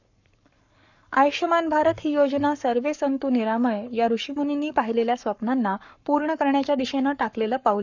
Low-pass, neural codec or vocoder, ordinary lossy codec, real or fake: 7.2 kHz; codec, 44.1 kHz, 7.8 kbps, Pupu-Codec; none; fake